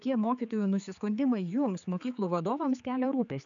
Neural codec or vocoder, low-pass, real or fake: codec, 16 kHz, 4 kbps, X-Codec, HuBERT features, trained on general audio; 7.2 kHz; fake